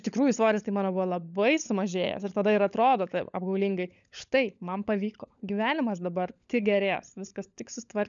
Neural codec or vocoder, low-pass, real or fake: codec, 16 kHz, 16 kbps, FunCodec, trained on LibriTTS, 50 frames a second; 7.2 kHz; fake